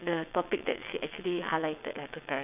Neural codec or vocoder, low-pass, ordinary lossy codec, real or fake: vocoder, 22.05 kHz, 80 mel bands, WaveNeXt; 3.6 kHz; none; fake